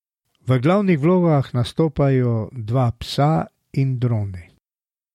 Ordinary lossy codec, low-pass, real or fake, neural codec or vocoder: MP3, 64 kbps; 19.8 kHz; real; none